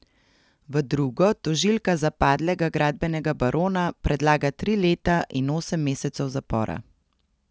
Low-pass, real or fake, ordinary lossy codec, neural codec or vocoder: none; real; none; none